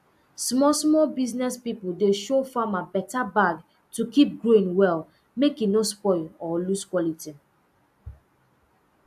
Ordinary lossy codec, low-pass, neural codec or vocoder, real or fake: AAC, 96 kbps; 14.4 kHz; none; real